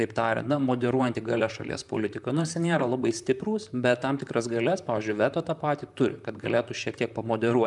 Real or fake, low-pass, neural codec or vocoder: fake; 10.8 kHz; vocoder, 44.1 kHz, 128 mel bands, Pupu-Vocoder